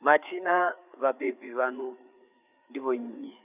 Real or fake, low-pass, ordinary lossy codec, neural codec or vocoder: fake; 3.6 kHz; none; codec, 16 kHz, 4 kbps, FreqCodec, larger model